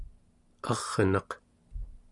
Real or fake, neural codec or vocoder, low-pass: real; none; 10.8 kHz